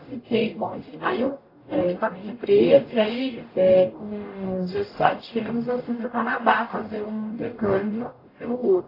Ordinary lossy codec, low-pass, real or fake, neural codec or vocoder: AAC, 24 kbps; 5.4 kHz; fake; codec, 44.1 kHz, 0.9 kbps, DAC